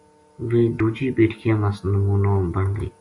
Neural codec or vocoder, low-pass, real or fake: none; 10.8 kHz; real